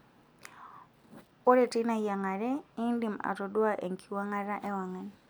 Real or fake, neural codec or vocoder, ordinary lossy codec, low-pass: real; none; none; none